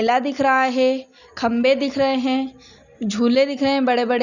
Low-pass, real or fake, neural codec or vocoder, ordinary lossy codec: 7.2 kHz; real; none; none